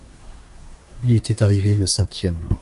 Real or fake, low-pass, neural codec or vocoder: fake; 10.8 kHz; codec, 24 kHz, 1 kbps, SNAC